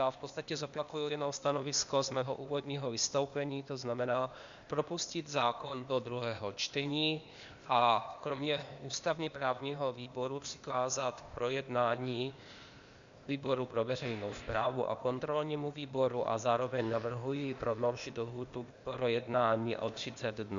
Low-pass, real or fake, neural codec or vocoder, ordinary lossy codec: 7.2 kHz; fake; codec, 16 kHz, 0.8 kbps, ZipCodec; Opus, 64 kbps